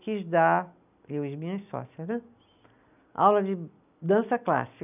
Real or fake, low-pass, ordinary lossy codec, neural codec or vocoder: real; 3.6 kHz; none; none